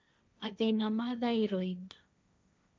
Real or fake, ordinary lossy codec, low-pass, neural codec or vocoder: fake; none; none; codec, 16 kHz, 1.1 kbps, Voila-Tokenizer